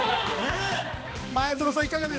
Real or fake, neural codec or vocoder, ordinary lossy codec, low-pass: fake; codec, 16 kHz, 2 kbps, X-Codec, HuBERT features, trained on balanced general audio; none; none